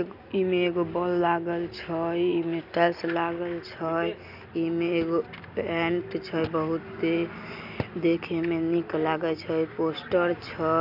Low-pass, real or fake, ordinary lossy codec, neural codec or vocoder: 5.4 kHz; real; none; none